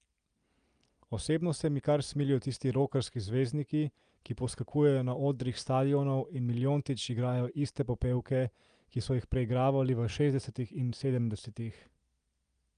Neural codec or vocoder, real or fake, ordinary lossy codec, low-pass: none; real; Opus, 32 kbps; 9.9 kHz